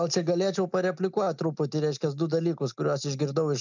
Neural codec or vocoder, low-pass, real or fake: none; 7.2 kHz; real